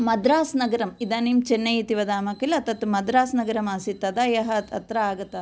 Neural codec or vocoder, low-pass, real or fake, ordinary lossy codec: none; none; real; none